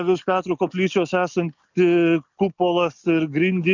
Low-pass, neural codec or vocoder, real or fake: 7.2 kHz; none; real